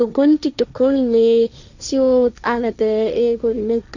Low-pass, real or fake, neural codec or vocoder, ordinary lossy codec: 7.2 kHz; fake; codec, 16 kHz, 1.1 kbps, Voila-Tokenizer; none